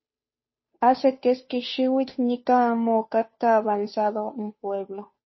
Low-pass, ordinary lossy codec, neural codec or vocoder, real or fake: 7.2 kHz; MP3, 24 kbps; codec, 16 kHz, 2 kbps, FunCodec, trained on Chinese and English, 25 frames a second; fake